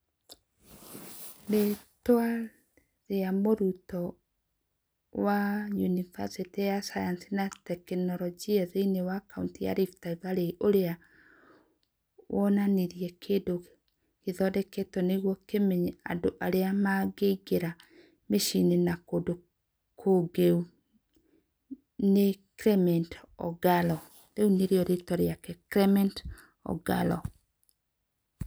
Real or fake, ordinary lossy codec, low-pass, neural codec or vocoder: real; none; none; none